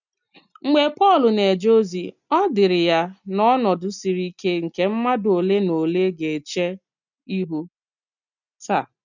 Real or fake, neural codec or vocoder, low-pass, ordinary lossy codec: real; none; 7.2 kHz; none